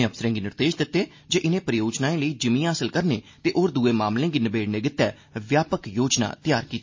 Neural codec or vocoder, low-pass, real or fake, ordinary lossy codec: none; 7.2 kHz; real; MP3, 32 kbps